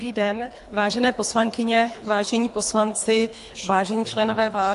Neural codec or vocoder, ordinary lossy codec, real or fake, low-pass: codec, 24 kHz, 3 kbps, HILCodec; AAC, 64 kbps; fake; 10.8 kHz